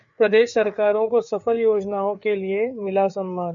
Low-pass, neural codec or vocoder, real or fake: 7.2 kHz; codec, 16 kHz, 4 kbps, FreqCodec, larger model; fake